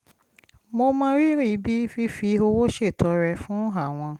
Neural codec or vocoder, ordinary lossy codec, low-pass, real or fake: none; Opus, 24 kbps; 19.8 kHz; real